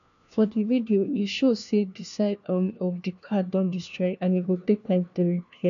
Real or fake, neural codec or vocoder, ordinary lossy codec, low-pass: fake; codec, 16 kHz, 1 kbps, FunCodec, trained on LibriTTS, 50 frames a second; none; 7.2 kHz